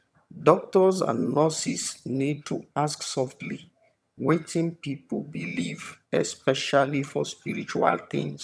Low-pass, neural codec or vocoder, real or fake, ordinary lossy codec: none; vocoder, 22.05 kHz, 80 mel bands, HiFi-GAN; fake; none